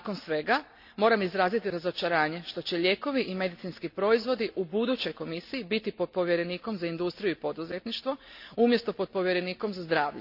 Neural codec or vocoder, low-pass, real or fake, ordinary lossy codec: none; 5.4 kHz; real; none